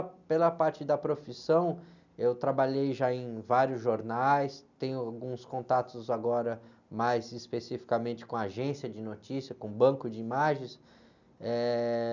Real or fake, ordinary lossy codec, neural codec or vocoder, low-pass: real; none; none; 7.2 kHz